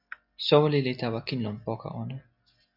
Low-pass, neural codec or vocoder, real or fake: 5.4 kHz; none; real